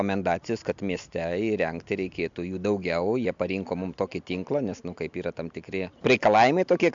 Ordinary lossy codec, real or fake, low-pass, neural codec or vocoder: MP3, 64 kbps; real; 7.2 kHz; none